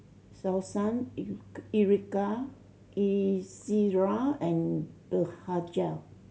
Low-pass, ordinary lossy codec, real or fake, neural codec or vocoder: none; none; real; none